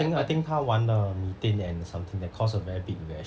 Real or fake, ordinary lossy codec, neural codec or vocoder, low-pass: real; none; none; none